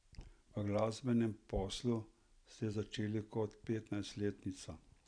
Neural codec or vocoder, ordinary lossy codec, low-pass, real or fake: none; none; 9.9 kHz; real